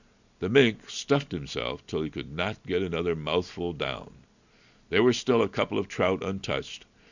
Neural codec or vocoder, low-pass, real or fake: none; 7.2 kHz; real